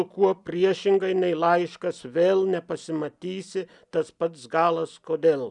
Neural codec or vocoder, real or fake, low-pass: none; real; 10.8 kHz